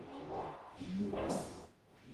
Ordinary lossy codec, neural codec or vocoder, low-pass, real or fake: Opus, 24 kbps; codec, 44.1 kHz, 0.9 kbps, DAC; 14.4 kHz; fake